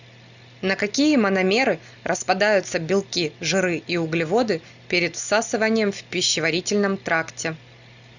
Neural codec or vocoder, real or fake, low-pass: none; real; 7.2 kHz